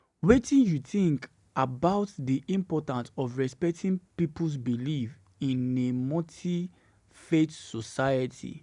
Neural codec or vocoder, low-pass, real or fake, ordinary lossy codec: none; 10.8 kHz; real; none